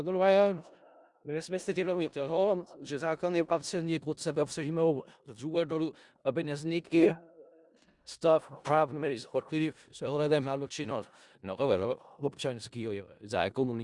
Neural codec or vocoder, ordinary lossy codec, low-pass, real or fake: codec, 16 kHz in and 24 kHz out, 0.4 kbps, LongCat-Audio-Codec, four codebook decoder; Opus, 64 kbps; 10.8 kHz; fake